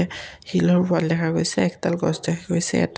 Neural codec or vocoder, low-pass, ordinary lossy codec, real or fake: none; none; none; real